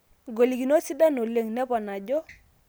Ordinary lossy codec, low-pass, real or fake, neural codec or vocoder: none; none; real; none